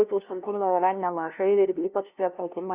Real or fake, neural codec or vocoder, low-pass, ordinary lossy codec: fake; codec, 16 kHz, 0.5 kbps, FunCodec, trained on LibriTTS, 25 frames a second; 3.6 kHz; Opus, 64 kbps